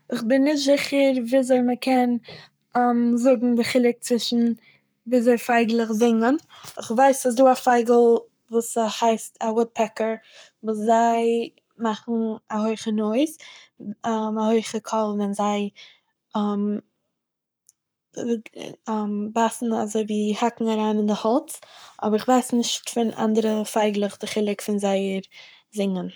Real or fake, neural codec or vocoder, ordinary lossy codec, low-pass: fake; codec, 44.1 kHz, 7.8 kbps, Pupu-Codec; none; none